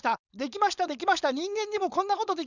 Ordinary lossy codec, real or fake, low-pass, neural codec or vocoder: none; fake; 7.2 kHz; codec, 16 kHz, 4.8 kbps, FACodec